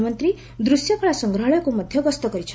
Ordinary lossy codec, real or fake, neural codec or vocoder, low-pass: none; real; none; none